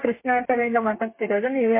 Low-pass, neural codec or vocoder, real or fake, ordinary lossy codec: 3.6 kHz; codec, 32 kHz, 1.9 kbps, SNAC; fake; MP3, 24 kbps